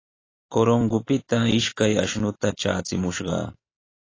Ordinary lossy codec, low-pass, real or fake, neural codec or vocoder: AAC, 32 kbps; 7.2 kHz; real; none